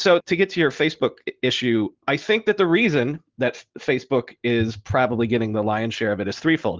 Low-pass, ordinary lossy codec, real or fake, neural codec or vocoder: 7.2 kHz; Opus, 16 kbps; real; none